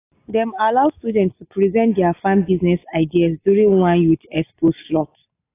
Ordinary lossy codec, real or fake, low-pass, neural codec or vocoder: AAC, 24 kbps; real; 3.6 kHz; none